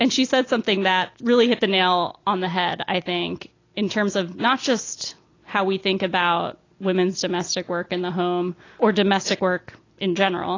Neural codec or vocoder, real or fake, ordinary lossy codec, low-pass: none; real; AAC, 32 kbps; 7.2 kHz